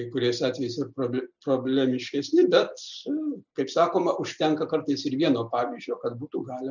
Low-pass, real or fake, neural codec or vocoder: 7.2 kHz; real; none